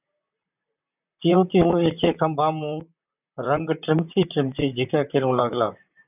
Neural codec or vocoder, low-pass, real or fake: vocoder, 44.1 kHz, 128 mel bands, Pupu-Vocoder; 3.6 kHz; fake